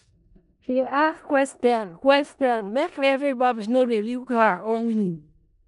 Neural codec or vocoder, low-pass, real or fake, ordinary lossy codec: codec, 16 kHz in and 24 kHz out, 0.4 kbps, LongCat-Audio-Codec, four codebook decoder; 10.8 kHz; fake; none